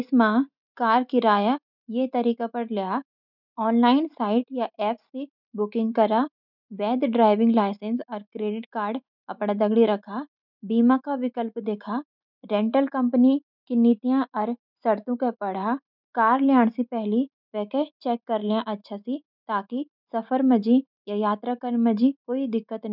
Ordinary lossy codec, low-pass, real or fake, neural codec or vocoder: none; 5.4 kHz; real; none